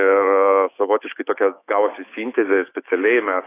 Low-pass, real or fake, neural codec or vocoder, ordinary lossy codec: 3.6 kHz; fake; autoencoder, 48 kHz, 128 numbers a frame, DAC-VAE, trained on Japanese speech; AAC, 24 kbps